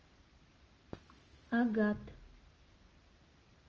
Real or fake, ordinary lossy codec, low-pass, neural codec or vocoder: real; Opus, 24 kbps; 7.2 kHz; none